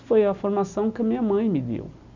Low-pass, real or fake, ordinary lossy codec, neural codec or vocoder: 7.2 kHz; real; none; none